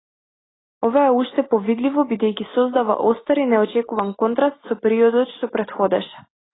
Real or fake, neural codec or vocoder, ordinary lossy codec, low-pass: real; none; AAC, 16 kbps; 7.2 kHz